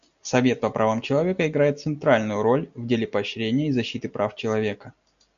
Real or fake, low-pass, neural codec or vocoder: real; 7.2 kHz; none